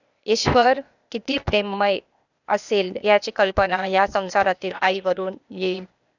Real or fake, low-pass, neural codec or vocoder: fake; 7.2 kHz; codec, 16 kHz, 0.8 kbps, ZipCodec